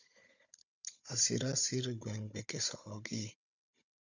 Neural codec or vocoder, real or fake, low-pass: codec, 16 kHz, 16 kbps, FunCodec, trained on Chinese and English, 50 frames a second; fake; 7.2 kHz